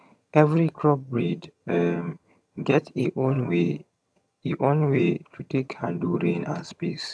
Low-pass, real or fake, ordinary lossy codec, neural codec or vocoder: none; fake; none; vocoder, 22.05 kHz, 80 mel bands, HiFi-GAN